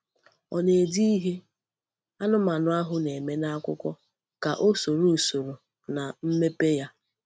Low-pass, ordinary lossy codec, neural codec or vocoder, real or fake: none; none; none; real